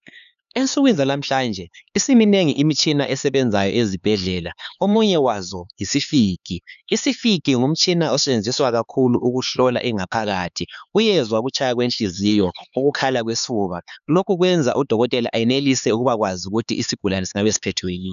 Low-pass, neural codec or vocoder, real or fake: 7.2 kHz; codec, 16 kHz, 4 kbps, X-Codec, HuBERT features, trained on LibriSpeech; fake